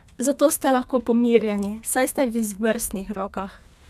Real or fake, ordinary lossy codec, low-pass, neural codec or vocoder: fake; none; 14.4 kHz; codec, 32 kHz, 1.9 kbps, SNAC